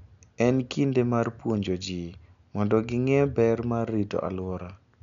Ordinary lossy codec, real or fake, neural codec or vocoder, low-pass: none; real; none; 7.2 kHz